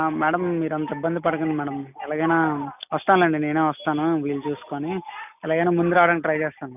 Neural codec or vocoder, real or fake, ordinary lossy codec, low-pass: none; real; none; 3.6 kHz